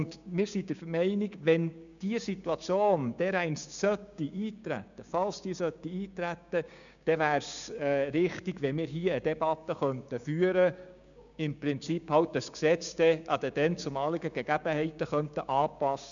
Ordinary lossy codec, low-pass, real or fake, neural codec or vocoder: none; 7.2 kHz; fake; codec, 16 kHz, 6 kbps, DAC